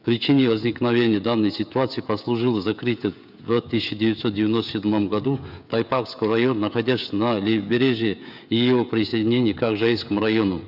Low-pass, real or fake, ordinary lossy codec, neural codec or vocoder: 5.4 kHz; fake; none; codec, 16 kHz, 16 kbps, FreqCodec, smaller model